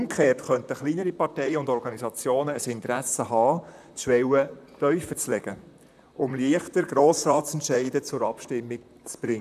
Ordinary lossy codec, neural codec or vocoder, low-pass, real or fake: none; vocoder, 44.1 kHz, 128 mel bands, Pupu-Vocoder; 14.4 kHz; fake